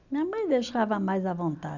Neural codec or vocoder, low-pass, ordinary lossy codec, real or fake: none; 7.2 kHz; none; real